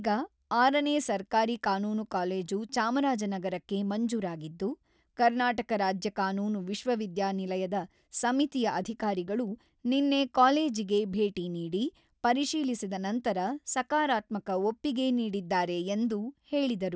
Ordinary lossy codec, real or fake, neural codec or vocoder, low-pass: none; real; none; none